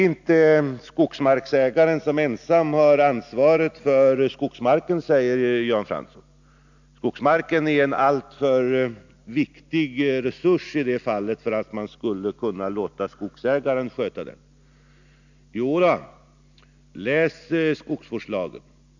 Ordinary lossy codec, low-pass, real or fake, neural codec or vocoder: none; 7.2 kHz; real; none